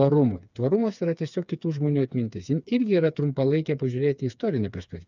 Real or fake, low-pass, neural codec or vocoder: fake; 7.2 kHz; codec, 16 kHz, 4 kbps, FreqCodec, smaller model